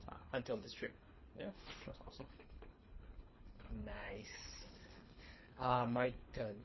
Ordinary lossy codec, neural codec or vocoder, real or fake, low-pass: MP3, 24 kbps; codec, 24 kHz, 3 kbps, HILCodec; fake; 7.2 kHz